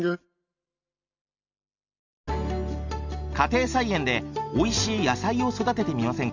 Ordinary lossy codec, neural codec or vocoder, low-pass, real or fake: none; none; 7.2 kHz; real